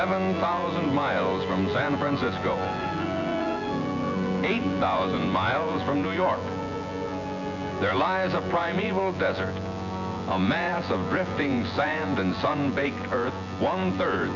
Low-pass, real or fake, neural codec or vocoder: 7.2 kHz; fake; vocoder, 24 kHz, 100 mel bands, Vocos